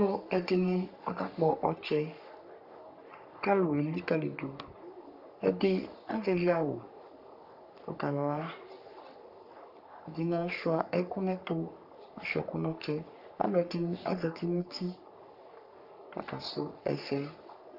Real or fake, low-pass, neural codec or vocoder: fake; 5.4 kHz; codec, 44.1 kHz, 3.4 kbps, Pupu-Codec